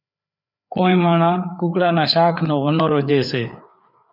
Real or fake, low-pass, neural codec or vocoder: fake; 5.4 kHz; codec, 16 kHz, 4 kbps, FreqCodec, larger model